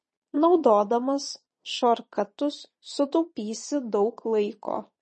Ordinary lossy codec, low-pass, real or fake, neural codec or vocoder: MP3, 32 kbps; 9.9 kHz; fake; vocoder, 22.05 kHz, 80 mel bands, Vocos